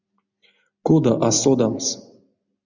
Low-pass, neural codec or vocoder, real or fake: 7.2 kHz; none; real